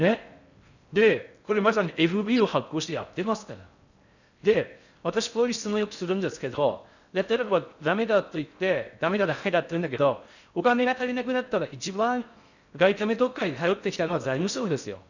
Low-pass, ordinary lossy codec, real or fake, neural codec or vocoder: 7.2 kHz; none; fake; codec, 16 kHz in and 24 kHz out, 0.6 kbps, FocalCodec, streaming, 4096 codes